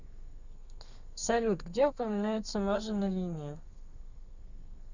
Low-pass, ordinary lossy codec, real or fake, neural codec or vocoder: 7.2 kHz; Opus, 64 kbps; fake; codec, 32 kHz, 1.9 kbps, SNAC